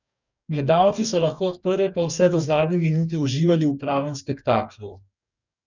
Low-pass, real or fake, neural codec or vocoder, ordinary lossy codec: 7.2 kHz; fake; codec, 44.1 kHz, 2.6 kbps, DAC; none